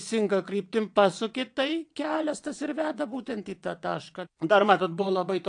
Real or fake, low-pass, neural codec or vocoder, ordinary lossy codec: fake; 9.9 kHz; vocoder, 22.05 kHz, 80 mel bands, WaveNeXt; AAC, 64 kbps